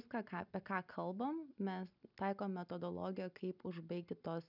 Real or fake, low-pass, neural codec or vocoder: real; 5.4 kHz; none